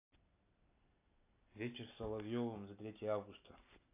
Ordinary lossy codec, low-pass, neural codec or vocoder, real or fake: AAC, 24 kbps; 3.6 kHz; none; real